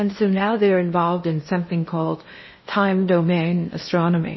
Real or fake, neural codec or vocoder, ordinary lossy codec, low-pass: fake; codec, 16 kHz in and 24 kHz out, 0.6 kbps, FocalCodec, streaming, 2048 codes; MP3, 24 kbps; 7.2 kHz